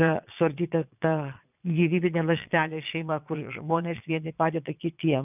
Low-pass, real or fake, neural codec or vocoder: 3.6 kHz; fake; vocoder, 22.05 kHz, 80 mel bands, Vocos